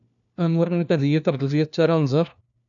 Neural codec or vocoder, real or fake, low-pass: codec, 16 kHz, 1 kbps, FunCodec, trained on LibriTTS, 50 frames a second; fake; 7.2 kHz